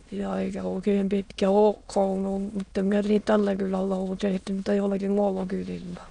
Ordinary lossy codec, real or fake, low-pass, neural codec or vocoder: none; fake; 9.9 kHz; autoencoder, 22.05 kHz, a latent of 192 numbers a frame, VITS, trained on many speakers